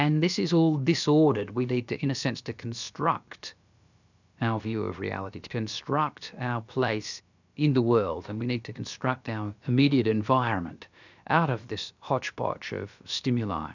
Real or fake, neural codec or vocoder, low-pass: fake; codec, 16 kHz, about 1 kbps, DyCAST, with the encoder's durations; 7.2 kHz